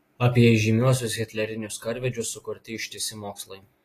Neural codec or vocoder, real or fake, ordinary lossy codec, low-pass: none; real; AAC, 48 kbps; 14.4 kHz